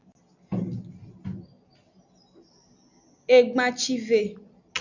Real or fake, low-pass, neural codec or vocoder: real; 7.2 kHz; none